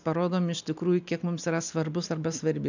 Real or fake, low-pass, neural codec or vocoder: real; 7.2 kHz; none